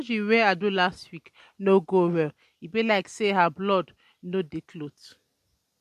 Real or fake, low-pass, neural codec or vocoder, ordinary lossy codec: real; 14.4 kHz; none; MP3, 64 kbps